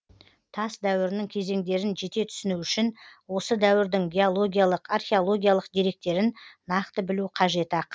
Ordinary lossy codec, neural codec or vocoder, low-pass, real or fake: none; none; none; real